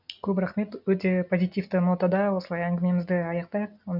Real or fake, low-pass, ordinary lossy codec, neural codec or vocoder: real; 5.4 kHz; none; none